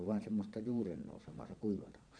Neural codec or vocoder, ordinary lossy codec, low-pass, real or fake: vocoder, 22.05 kHz, 80 mel bands, Vocos; MP3, 96 kbps; 9.9 kHz; fake